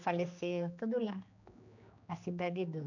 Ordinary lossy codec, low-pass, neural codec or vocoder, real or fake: none; 7.2 kHz; codec, 16 kHz, 2 kbps, X-Codec, HuBERT features, trained on general audio; fake